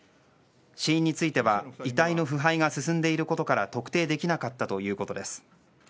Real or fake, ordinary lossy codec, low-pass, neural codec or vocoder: real; none; none; none